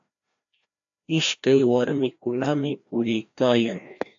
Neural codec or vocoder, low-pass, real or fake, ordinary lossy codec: codec, 16 kHz, 1 kbps, FreqCodec, larger model; 7.2 kHz; fake; AAC, 64 kbps